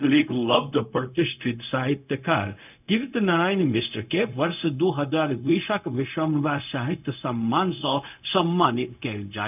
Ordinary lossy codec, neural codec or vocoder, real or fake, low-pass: none; codec, 16 kHz, 0.4 kbps, LongCat-Audio-Codec; fake; 3.6 kHz